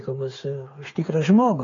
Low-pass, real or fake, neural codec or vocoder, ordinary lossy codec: 7.2 kHz; fake; codec, 16 kHz, 8 kbps, FreqCodec, smaller model; AAC, 32 kbps